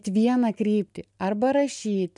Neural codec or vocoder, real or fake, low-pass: none; real; 10.8 kHz